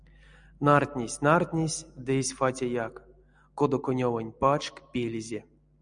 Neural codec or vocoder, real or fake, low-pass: none; real; 9.9 kHz